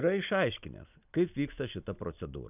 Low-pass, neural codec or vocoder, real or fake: 3.6 kHz; none; real